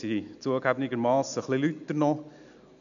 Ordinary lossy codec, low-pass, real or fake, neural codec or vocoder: none; 7.2 kHz; real; none